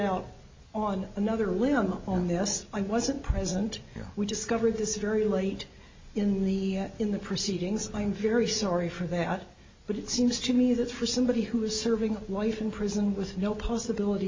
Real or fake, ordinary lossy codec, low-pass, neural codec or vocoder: real; MP3, 32 kbps; 7.2 kHz; none